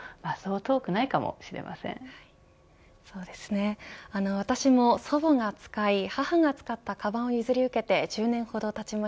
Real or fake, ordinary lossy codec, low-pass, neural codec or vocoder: real; none; none; none